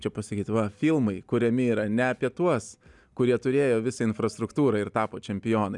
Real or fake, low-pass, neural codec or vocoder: real; 10.8 kHz; none